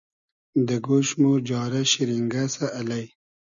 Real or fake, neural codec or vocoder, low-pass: real; none; 7.2 kHz